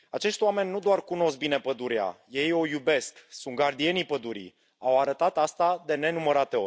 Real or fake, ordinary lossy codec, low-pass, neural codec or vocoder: real; none; none; none